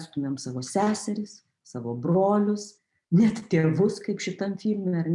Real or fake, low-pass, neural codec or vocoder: real; 10.8 kHz; none